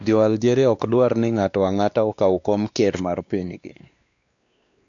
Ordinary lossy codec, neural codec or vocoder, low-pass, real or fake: none; codec, 16 kHz, 2 kbps, X-Codec, WavLM features, trained on Multilingual LibriSpeech; 7.2 kHz; fake